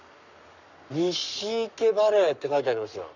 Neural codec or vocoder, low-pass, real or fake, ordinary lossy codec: codec, 44.1 kHz, 7.8 kbps, Pupu-Codec; 7.2 kHz; fake; none